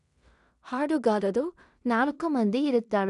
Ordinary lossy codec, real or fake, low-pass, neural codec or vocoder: none; fake; 10.8 kHz; codec, 16 kHz in and 24 kHz out, 0.4 kbps, LongCat-Audio-Codec, two codebook decoder